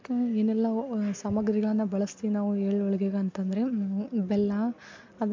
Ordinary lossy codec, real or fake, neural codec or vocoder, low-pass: MP3, 48 kbps; real; none; 7.2 kHz